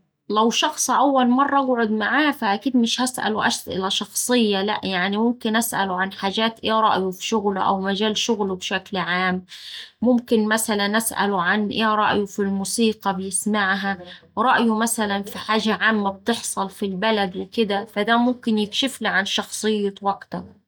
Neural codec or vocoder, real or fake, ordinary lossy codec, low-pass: none; real; none; none